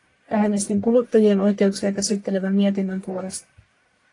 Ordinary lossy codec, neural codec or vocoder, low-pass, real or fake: AAC, 48 kbps; codec, 44.1 kHz, 1.7 kbps, Pupu-Codec; 10.8 kHz; fake